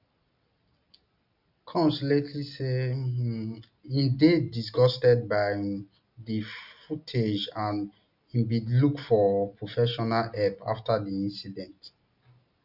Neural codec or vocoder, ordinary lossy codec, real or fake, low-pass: none; none; real; 5.4 kHz